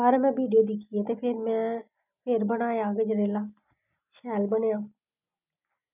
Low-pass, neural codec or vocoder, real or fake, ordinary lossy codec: 3.6 kHz; none; real; none